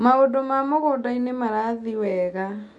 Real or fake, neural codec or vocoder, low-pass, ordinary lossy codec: real; none; 10.8 kHz; MP3, 96 kbps